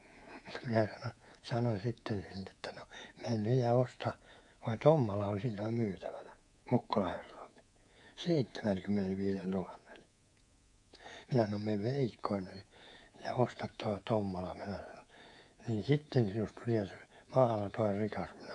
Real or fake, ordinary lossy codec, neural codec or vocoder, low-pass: fake; AAC, 64 kbps; codec, 24 kHz, 3.1 kbps, DualCodec; 10.8 kHz